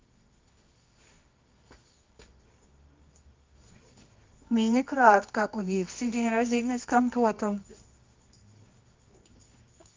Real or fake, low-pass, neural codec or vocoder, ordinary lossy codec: fake; 7.2 kHz; codec, 24 kHz, 0.9 kbps, WavTokenizer, medium music audio release; Opus, 24 kbps